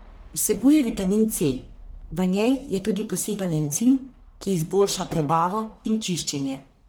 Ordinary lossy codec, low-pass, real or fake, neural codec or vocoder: none; none; fake; codec, 44.1 kHz, 1.7 kbps, Pupu-Codec